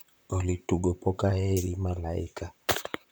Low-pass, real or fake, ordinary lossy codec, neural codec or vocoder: none; fake; none; vocoder, 44.1 kHz, 128 mel bands, Pupu-Vocoder